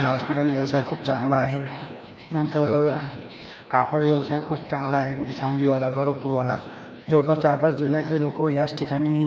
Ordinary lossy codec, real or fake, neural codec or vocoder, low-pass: none; fake; codec, 16 kHz, 1 kbps, FreqCodec, larger model; none